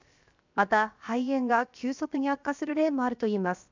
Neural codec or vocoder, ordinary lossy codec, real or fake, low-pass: codec, 16 kHz, 0.7 kbps, FocalCodec; MP3, 64 kbps; fake; 7.2 kHz